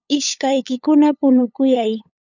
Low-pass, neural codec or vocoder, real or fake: 7.2 kHz; codec, 16 kHz, 8 kbps, FunCodec, trained on LibriTTS, 25 frames a second; fake